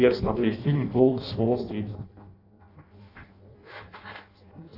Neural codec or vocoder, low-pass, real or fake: codec, 16 kHz in and 24 kHz out, 0.6 kbps, FireRedTTS-2 codec; 5.4 kHz; fake